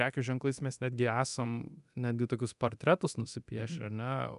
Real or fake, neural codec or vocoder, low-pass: fake; codec, 24 kHz, 0.9 kbps, DualCodec; 10.8 kHz